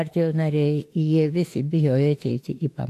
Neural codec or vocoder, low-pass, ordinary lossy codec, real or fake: autoencoder, 48 kHz, 32 numbers a frame, DAC-VAE, trained on Japanese speech; 14.4 kHz; AAC, 64 kbps; fake